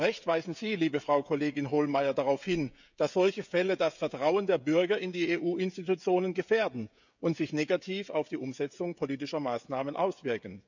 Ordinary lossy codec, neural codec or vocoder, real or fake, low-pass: none; codec, 16 kHz, 16 kbps, FreqCodec, smaller model; fake; 7.2 kHz